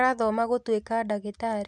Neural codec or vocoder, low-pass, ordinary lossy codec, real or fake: none; 10.8 kHz; none; real